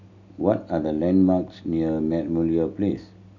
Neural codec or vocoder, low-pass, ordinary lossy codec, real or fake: none; 7.2 kHz; none; real